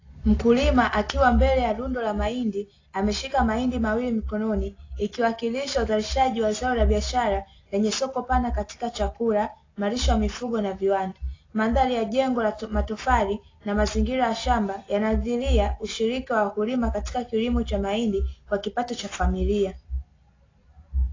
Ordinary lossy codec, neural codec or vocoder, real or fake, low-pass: AAC, 32 kbps; none; real; 7.2 kHz